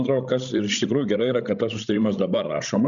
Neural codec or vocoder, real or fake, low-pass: codec, 16 kHz, 16 kbps, FreqCodec, larger model; fake; 7.2 kHz